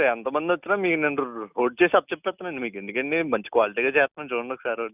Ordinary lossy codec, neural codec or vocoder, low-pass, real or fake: none; none; 3.6 kHz; real